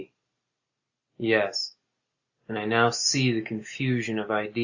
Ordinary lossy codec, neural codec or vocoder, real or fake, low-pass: Opus, 64 kbps; none; real; 7.2 kHz